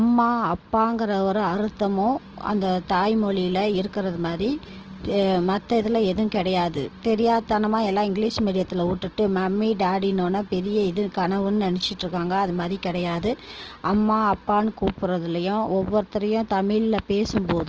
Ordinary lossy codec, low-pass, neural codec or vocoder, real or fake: Opus, 16 kbps; 7.2 kHz; none; real